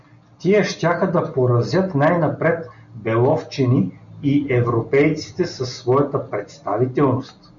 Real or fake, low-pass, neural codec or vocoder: real; 7.2 kHz; none